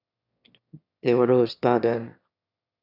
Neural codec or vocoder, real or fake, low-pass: autoencoder, 22.05 kHz, a latent of 192 numbers a frame, VITS, trained on one speaker; fake; 5.4 kHz